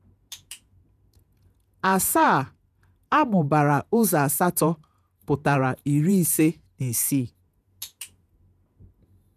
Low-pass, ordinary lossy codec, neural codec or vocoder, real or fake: 14.4 kHz; none; vocoder, 48 kHz, 128 mel bands, Vocos; fake